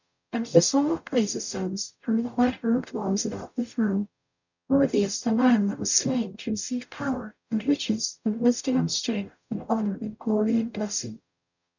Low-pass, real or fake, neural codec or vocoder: 7.2 kHz; fake; codec, 44.1 kHz, 0.9 kbps, DAC